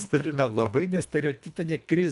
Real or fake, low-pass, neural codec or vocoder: fake; 10.8 kHz; codec, 24 kHz, 1.5 kbps, HILCodec